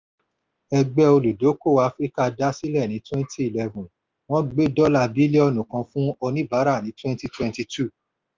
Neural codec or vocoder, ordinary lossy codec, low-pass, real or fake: none; Opus, 32 kbps; 7.2 kHz; real